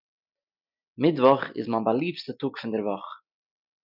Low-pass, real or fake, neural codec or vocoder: 5.4 kHz; real; none